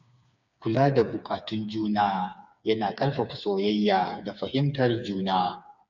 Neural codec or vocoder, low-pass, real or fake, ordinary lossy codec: codec, 16 kHz, 4 kbps, FreqCodec, smaller model; 7.2 kHz; fake; none